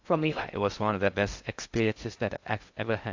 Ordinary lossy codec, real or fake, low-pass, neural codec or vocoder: AAC, 48 kbps; fake; 7.2 kHz; codec, 16 kHz in and 24 kHz out, 0.6 kbps, FocalCodec, streaming, 2048 codes